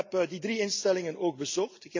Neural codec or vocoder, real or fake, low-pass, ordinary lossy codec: none; real; 7.2 kHz; MP3, 64 kbps